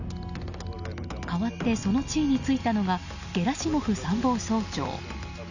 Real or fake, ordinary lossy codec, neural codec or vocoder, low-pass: real; none; none; 7.2 kHz